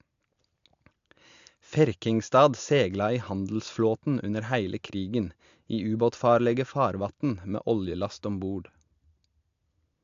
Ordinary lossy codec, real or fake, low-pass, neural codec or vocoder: AAC, 64 kbps; real; 7.2 kHz; none